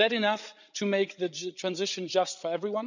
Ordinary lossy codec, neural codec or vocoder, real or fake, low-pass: none; codec, 16 kHz, 16 kbps, FreqCodec, larger model; fake; 7.2 kHz